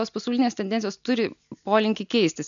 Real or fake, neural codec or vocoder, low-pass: real; none; 7.2 kHz